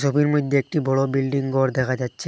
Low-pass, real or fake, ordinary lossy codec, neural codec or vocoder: none; real; none; none